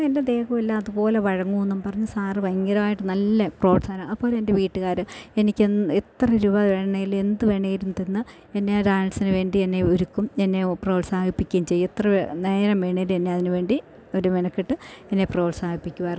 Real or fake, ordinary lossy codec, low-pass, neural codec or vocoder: real; none; none; none